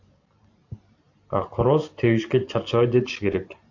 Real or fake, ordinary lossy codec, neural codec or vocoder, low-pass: fake; AAC, 48 kbps; vocoder, 44.1 kHz, 128 mel bands every 512 samples, BigVGAN v2; 7.2 kHz